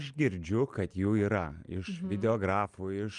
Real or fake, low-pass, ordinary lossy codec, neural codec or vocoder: real; 10.8 kHz; Opus, 32 kbps; none